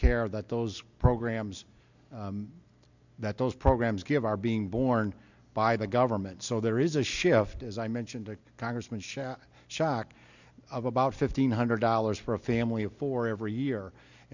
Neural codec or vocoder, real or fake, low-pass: none; real; 7.2 kHz